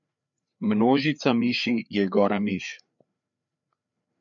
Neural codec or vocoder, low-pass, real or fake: codec, 16 kHz, 4 kbps, FreqCodec, larger model; 7.2 kHz; fake